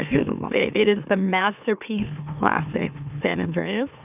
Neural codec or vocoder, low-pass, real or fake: autoencoder, 44.1 kHz, a latent of 192 numbers a frame, MeloTTS; 3.6 kHz; fake